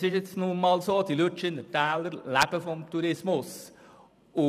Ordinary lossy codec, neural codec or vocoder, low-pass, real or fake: none; vocoder, 44.1 kHz, 128 mel bands every 512 samples, BigVGAN v2; 14.4 kHz; fake